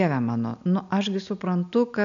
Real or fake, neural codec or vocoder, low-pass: real; none; 7.2 kHz